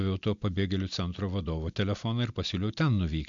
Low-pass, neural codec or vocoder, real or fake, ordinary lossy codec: 7.2 kHz; none; real; MP3, 64 kbps